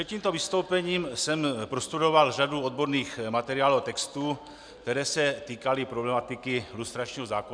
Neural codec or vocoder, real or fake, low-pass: none; real; 9.9 kHz